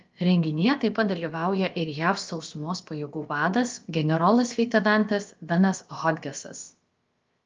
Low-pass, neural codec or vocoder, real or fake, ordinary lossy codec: 7.2 kHz; codec, 16 kHz, about 1 kbps, DyCAST, with the encoder's durations; fake; Opus, 32 kbps